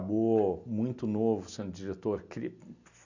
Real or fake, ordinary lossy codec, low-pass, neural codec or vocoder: real; none; 7.2 kHz; none